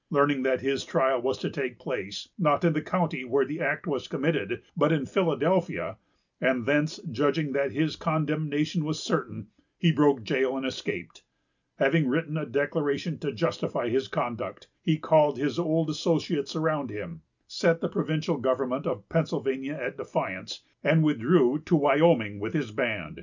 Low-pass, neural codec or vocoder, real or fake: 7.2 kHz; none; real